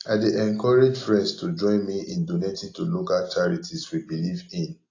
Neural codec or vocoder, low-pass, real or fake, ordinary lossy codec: none; 7.2 kHz; real; AAC, 32 kbps